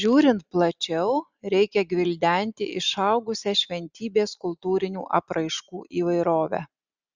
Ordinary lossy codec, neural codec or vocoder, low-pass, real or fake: Opus, 64 kbps; none; 7.2 kHz; real